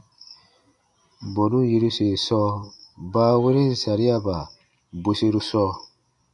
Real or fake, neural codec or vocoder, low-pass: real; none; 10.8 kHz